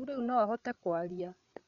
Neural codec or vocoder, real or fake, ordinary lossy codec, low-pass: codec, 16 kHz, 8 kbps, FreqCodec, larger model; fake; none; 7.2 kHz